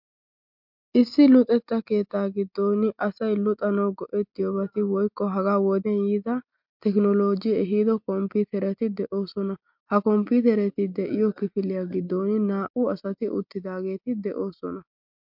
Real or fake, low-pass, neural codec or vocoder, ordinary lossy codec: real; 5.4 kHz; none; MP3, 48 kbps